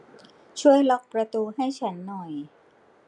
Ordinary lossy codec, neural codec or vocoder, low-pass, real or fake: none; none; 10.8 kHz; real